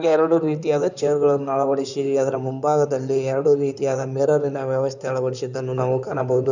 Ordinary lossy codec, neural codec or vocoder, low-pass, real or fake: none; codec, 16 kHz in and 24 kHz out, 2.2 kbps, FireRedTTS-2 codec; 7.2 kHz; fake